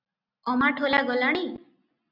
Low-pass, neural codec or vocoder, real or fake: 5.4 kHz; none; real